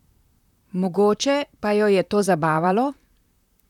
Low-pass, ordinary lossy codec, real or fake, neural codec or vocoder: 19.8 kHz; none; fake; vocoder, 44.1 kHz, 128 mel bands, Pupu-Vocoder